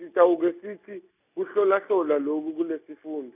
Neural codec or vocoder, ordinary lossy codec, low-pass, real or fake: none; none; 3.6 kHz; real